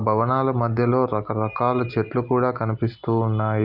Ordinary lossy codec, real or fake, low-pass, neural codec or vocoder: Opus, 24 kbps; real; 5.4 kHz; none